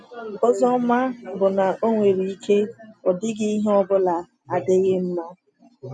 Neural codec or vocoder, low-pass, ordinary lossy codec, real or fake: none; none; none; real